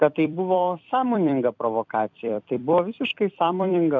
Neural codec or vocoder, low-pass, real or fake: vocoder, 44.1 kHz, 128 mel bands every 256 samples, BigVGAN v2; 7.2 kHz; fake